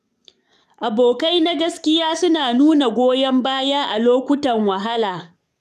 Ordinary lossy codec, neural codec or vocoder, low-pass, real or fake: none; codec, 44.1 kHz, 7.8 kbps, DAC; 14.4 kHz; fake